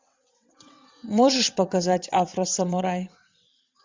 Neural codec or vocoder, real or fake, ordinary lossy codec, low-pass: vocoder, 22.05 kHz, 80 mel bands, WaveNeXt; fake; MP3, 64 kbps; 7.2 kHz